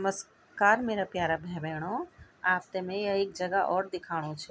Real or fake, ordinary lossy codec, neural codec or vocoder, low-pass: real; none; none; none